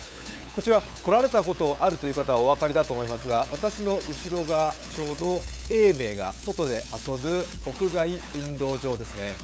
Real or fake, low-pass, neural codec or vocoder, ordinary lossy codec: fake; none; codec, 16 kHz, 8 kbps, FunCodec, trained on LibriTTS, 25 frames a second; none